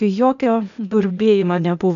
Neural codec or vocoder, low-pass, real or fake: codec, 16 kHz, 0.8 kbps, ZipCodec; 7.2 kHz; fake